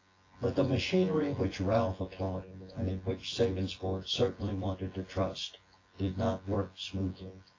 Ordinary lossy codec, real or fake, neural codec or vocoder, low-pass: AAC, 32 kbps; fake; vocoder, 24 kHz, 100 mel bands, Vocos; 7.2 kHz